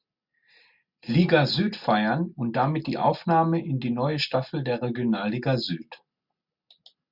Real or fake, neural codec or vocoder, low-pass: real; none; 5.4 kHz